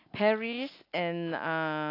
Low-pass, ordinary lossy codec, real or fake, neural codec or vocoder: 5.4 kHz; AAC, 32 kbps; real; none